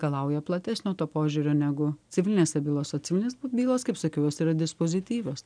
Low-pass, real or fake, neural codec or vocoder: 9.9 kHz; real; none